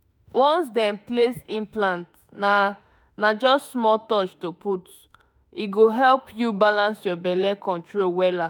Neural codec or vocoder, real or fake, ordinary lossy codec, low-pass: autoencoder, 48 kHz, 32 numbers a frame, DAC-VAE, trained on Japanese speech; fake; none; none